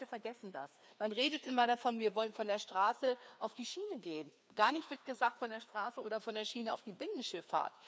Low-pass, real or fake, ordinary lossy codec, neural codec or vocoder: none; fake; none; codec, 16 kHz, 2 kbps, FreqCodec, larger model